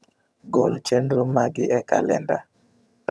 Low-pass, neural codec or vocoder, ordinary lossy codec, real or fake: none; vocoder, 22.05 kHz, 80 mel bands, HiFi-GAN; none; fake